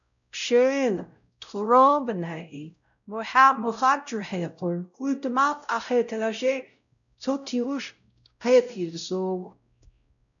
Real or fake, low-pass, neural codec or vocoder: fake; 7.2 kHz; codec, 16 kHz, 0.5 kbps, X-Codec, WavLM features, trained on Multilingual LibriSpeech